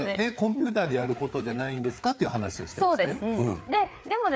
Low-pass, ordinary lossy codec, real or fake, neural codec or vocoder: none; none; fake; codec, 16 kHz, 4 kbps, FreqCodec, larger model